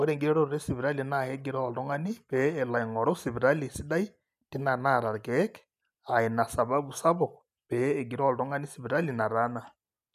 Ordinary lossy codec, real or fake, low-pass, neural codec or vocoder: none; real; 14.4 kHz; none